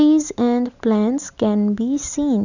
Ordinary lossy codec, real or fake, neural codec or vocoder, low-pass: none; real; none; 7.2 kHz